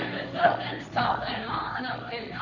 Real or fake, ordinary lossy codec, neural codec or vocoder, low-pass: fake; none; codec, 16 kHz, 1.1 kbps, Voila-Tokenizer; 7.2 kHz